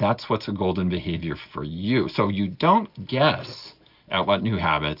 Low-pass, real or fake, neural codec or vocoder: 5.4 kHz; real; none